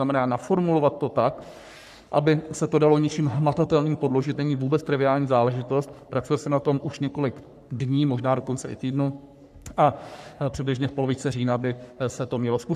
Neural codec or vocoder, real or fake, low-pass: codec, 44.1 kHz, 3.4 kbps, Pupu-Codec; fake; 14.4 kHz